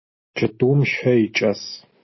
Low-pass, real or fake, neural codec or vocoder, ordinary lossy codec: 7.2 kHz; real; none; MP3, 24 kbps